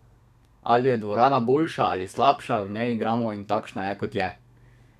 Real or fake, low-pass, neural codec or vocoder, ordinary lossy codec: fake; 14.4 kHz; codec, 32 kHz, 1.9 kbps, SNAC; none